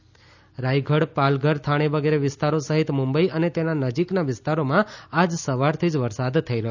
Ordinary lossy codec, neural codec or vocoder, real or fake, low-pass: none; none; real; 7.2 kHz